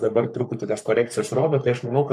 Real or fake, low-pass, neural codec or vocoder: fake; 14.4 kHz; codec, 44.1 kHz, 3.4 kbps, Pupu-Codec